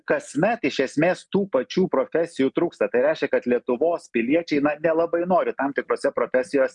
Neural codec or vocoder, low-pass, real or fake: none; 10.8 kHz; real